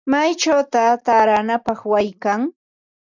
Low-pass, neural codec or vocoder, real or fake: 7.2 kHz; none; real